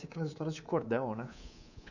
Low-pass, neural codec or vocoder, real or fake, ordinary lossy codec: 7.2 kHz; codec, 24 kHz, 3.1 kbps, DualCodec; fake; none